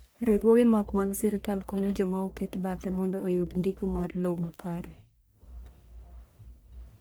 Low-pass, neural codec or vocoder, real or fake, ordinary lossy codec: none; codec, 44.1 kHz, 1.7 kbps, Pupu-Codec; fake; none